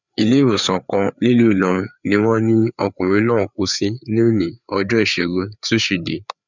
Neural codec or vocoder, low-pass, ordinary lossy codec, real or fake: codec, 16 kHz, 4 kbps, FreqCodec, larger model; 7.2 kHz; none; fake